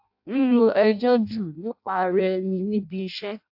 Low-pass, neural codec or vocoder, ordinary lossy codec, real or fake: 5.4 kHz; codec, 16 kHz in and 24 kHz out, 0.6 kbps, FireRedTTS-2 codec; AAC, 48 kbps; fake